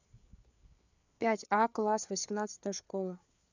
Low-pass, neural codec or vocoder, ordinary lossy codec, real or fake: 7.2 kHz; codec, 16 kHz, 4 kbps, FreqCodec, larger model; none; fake